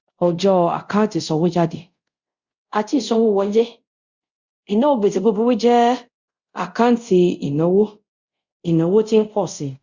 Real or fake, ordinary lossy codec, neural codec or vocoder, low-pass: fake; Opus, 64 kbps; codec, 24 kHz, 0.5 kbps, DualCodec; 7.2 kHz